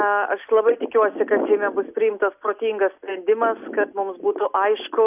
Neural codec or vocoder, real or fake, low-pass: none; real; 3.6 kHz